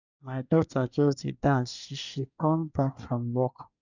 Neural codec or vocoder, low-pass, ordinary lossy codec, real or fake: codec, 24 kHz, 1 kbps, SNAC; 7.2 kHz; none; fake